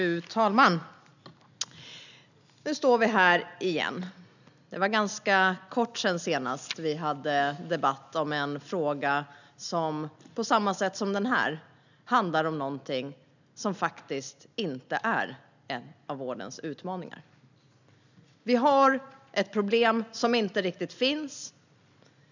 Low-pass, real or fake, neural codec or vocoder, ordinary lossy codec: 7.2 kHz; real; none; none